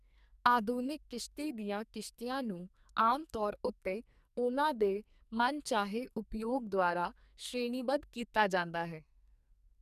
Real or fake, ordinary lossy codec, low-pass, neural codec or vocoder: fake; none; 14.4 kHz; codec, 44.1 kHz, 2.6 kbps, SNAC